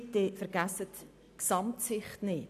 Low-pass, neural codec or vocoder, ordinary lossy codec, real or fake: 14.4 kHz; none; none; real